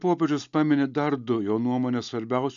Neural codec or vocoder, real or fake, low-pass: none; real; 7.2 kHz